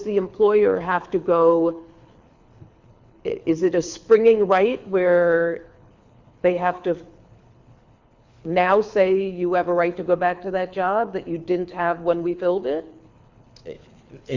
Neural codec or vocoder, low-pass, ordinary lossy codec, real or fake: codec, 24 kHz, 6 kbps, HILCodec; 7.2 kHz; Opus, 64 kbps; fake